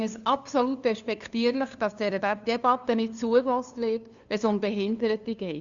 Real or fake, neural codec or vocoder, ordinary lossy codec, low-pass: fake; codec, 16 kHz, 2 kbps, FunCodec, trained on LibriTTS, 25 frames a second; Opus, 64 kbps; 7.2 kHz